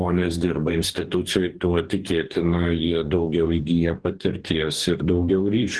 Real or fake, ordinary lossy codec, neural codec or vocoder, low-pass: fake; Opus, 16 kbps; codec, 44.1 kHz, 2.6 kbps, DAC; 10.8 kHz